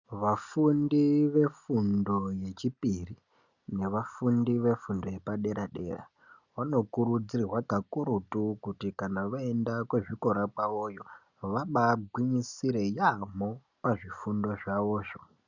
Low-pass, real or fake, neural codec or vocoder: 7.2 kHz; real; none